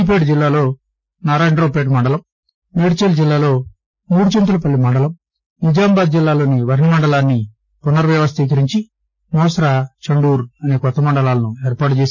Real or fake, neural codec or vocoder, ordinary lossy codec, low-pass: real; none; none; none